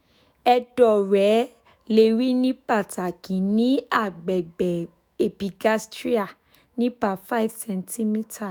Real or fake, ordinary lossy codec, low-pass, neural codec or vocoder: fake; none; none; autoencoder, 48 kHz, 128 numbers a frame, DAC-VAE, trained on Japanese speech